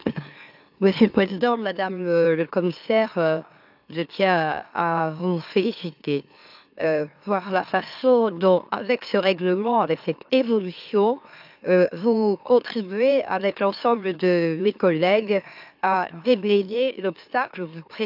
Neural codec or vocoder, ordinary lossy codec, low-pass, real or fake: autoencoder, 44.1 kHz, a latent of 192 numbers a frame, MeloTTS; AAC, 48 kbps; 5.4 kHz; fake